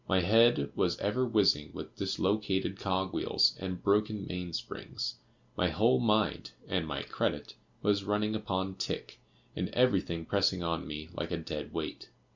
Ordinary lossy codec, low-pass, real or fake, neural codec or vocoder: Opus, 64 kbps; 7.2 kHz; real; none